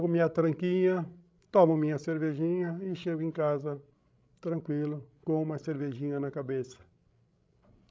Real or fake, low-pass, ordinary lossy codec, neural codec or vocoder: fake; none; none; codec, 16 kHz, 16 kbps, FreqCodec, larger model